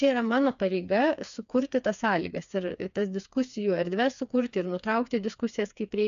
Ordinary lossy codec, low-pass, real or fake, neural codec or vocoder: AAC, 96 kbps; 7.2 kHz; fake; codec, 16 kHz, 4 kbps, FreqCodec, smaller model